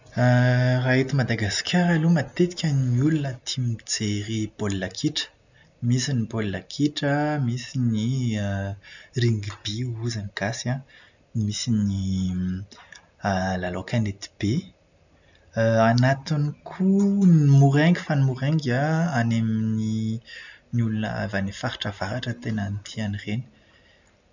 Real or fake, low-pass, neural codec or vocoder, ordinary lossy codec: real; 7.2 kHz; none; none